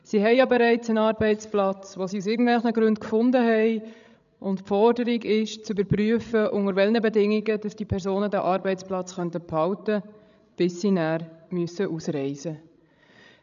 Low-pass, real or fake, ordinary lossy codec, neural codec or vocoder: 7.2 kHz; fake; none; codec, 16 kHz, 16 kbps, FreqCodec, larger model